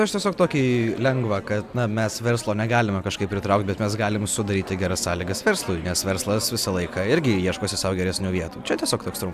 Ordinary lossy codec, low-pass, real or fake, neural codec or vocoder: AAC, 96 kbps; 14.4 kHz; real; none